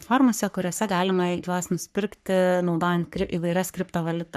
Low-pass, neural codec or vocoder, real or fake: 14.4 kHz; codec, 44.1 kHz, 3.4 kbps, Pupu-Codec; fake